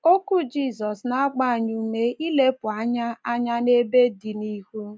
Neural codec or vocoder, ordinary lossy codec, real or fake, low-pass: none; none; real; none